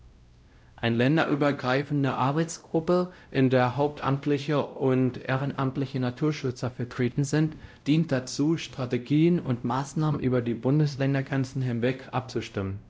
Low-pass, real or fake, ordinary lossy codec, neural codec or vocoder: none; fake; none; codec, 16 kHz, 0.5 kbps, X-Codec, WavLM features, trained on Multilingual LibriSpeech